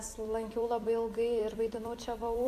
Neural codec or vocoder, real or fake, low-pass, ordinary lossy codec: vocoder, 44.1 kHz, 128 mel bands every 256 samples, BigVGAN v2; fake; 14.4 kHz; AAC, 64 kbps